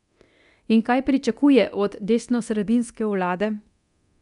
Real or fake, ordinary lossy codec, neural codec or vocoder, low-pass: fake; none; codec, 24 kHz, 0.9 kbps, DualCodec; 10.8 kHz